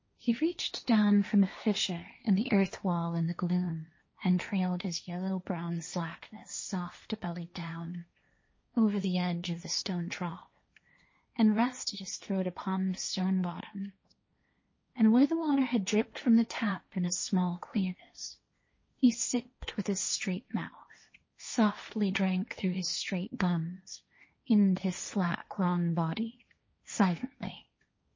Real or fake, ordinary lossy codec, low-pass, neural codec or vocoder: fake; MP3, 32 kbps; 7.2 kHz; codec, 16 kHz, 1.1 kbps, Voila-Tokenizer